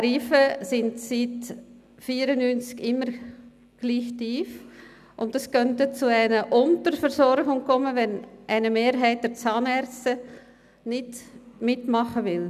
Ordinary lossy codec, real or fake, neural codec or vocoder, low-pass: none; real; none; 14.4 kHz